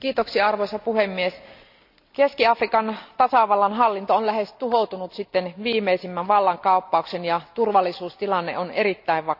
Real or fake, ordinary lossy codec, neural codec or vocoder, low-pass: real; none; none; 5.4 kHz